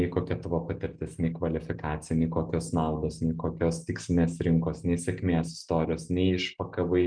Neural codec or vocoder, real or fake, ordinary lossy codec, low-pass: none; real; Opus, 32 kbps; 9.9 kHz